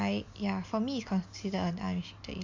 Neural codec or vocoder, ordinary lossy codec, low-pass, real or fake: none; MP3, 48 kbps; 7.2 kHz; real